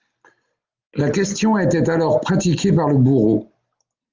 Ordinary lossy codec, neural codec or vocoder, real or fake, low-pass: Opus, 24 kbps; none; real; 7.2 kHz